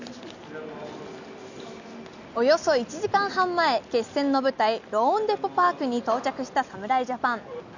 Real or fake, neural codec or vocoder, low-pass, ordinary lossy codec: real; none; 7.2 kHz; none